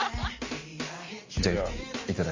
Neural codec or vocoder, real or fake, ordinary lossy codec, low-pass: none; real; MP3, 32 kbps; 7.2 kHz